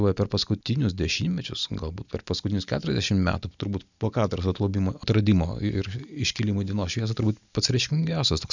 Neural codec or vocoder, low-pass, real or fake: none; 7.2 kHz; real